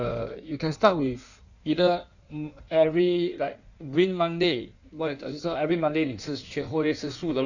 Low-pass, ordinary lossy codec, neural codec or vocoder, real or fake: 7.2 kHz; none; codec, 16 kHz in and 24 kHz out, 1.1 kbps, FireRedTTS-2 codec; fake